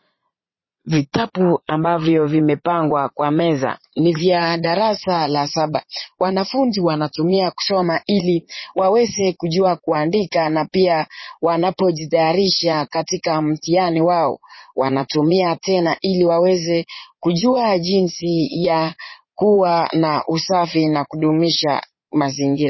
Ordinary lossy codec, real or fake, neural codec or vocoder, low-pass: MP3, 24 kbps; fake; vocoder, 22.05 kHz, 80 mel bands, Vocos; 7.2 kHz